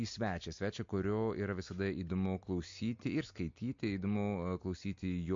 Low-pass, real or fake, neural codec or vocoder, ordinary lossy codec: 7.2 kHz; real; none; MP3, 48 kbps